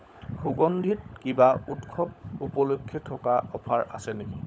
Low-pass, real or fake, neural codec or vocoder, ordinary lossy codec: none; fake; codec, 16 kHz, 16 kbps, FunCodec, trained on LibriTTS, 50 frames a second; none